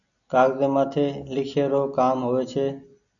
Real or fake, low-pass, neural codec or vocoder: real; 7.2 kHz; none